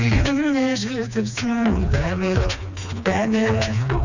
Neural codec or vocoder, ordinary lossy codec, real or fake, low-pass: codec, 16 kHz, 2 kbps, FreqCodec, smaller model; none; fake; 7.2 kHz